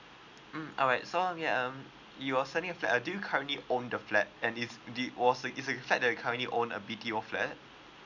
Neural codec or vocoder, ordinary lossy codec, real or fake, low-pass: none; none; real; 7.2 kHz